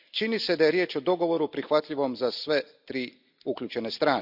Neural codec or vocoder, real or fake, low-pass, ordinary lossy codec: none; real; 5.4 kHz; none